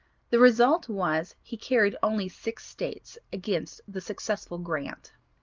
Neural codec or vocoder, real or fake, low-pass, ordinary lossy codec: none; real; 7.2 kHz; Opus, 32 kbps